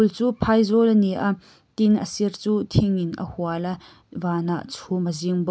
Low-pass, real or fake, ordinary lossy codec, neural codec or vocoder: none; real; none; none